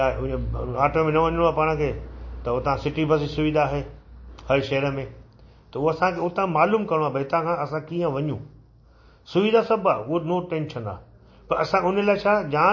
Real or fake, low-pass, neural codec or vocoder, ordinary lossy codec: real; 7.2 kHz; none; MP3, 32 kbps